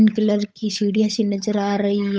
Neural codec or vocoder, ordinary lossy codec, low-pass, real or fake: codec, 16 kHz, 8 kbps, FunCodec, trained on Chinese and English, 25 frames a second; none; none; fake